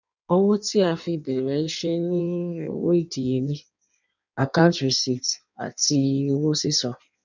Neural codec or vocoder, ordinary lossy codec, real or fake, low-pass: codec, 16 kHz in and 24 kHz out, 1.1 kbps, FireRedTTS-2 codec; none; fake; 7.2 kHz